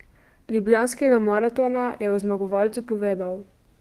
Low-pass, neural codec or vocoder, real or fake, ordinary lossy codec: 14.4 kHz; codec, 32 kHz, 1.9 kbps, SNAC; fake; Opus, 24 kbps